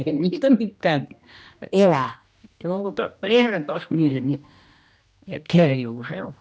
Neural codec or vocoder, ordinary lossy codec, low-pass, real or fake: codec, 16 kHz, 1 kbps, X-Codec, HuBERT features, trained on general audio; none; none; fake